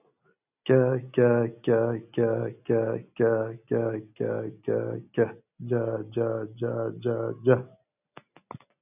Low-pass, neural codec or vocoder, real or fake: 3.6 kHz; none; real